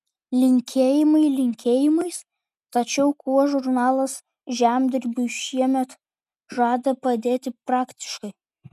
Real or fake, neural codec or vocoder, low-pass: real; none; 14.4 kHz